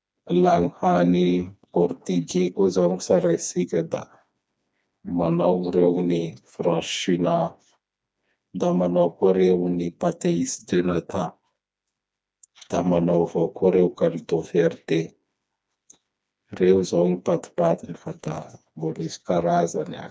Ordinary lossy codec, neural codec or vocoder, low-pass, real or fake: none; codec, 16 kHz, 2 kbps, FreqCodec, smaller model; none; fake